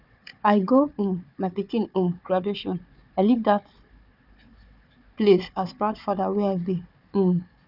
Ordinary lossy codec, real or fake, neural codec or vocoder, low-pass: none; fake; codec, 16 kHz, 4 kbps, FunCodec, trained on Chinese and English, 50 frames a second; 5.4 kHz